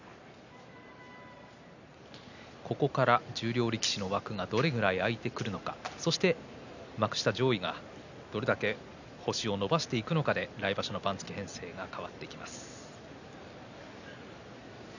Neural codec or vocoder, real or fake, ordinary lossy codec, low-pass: none; real; none; 7.2 kHz